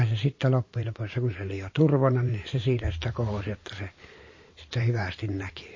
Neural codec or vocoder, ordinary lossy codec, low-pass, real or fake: vocoder, 44.1 kHz, 128 mel bands every 512 samples, BigVGAN v2; MP3, 32 kbps; 7.2 kHz; fake